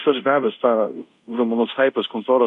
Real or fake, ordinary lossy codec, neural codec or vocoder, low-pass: fake; MP3, 64 kbps; codec, 24 kHz, 0.5 kbps, DualCodec; 10.8 kHz